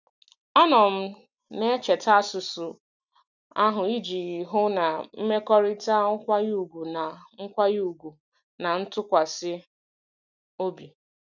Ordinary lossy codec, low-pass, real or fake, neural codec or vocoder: none; 7.2 kHz; real; none